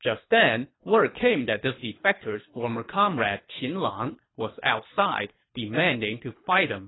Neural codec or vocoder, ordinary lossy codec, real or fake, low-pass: codec, 24 kHz, 3 kbps, HILCodec; AAC, 16 kbps; fake; 7.2 kHz